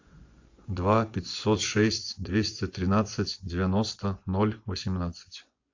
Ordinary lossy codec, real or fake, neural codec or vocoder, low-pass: AAC, 48 kbps; fake; vocoder, 24 kHz, 100 mel bands, Vocos; 7.2 kHz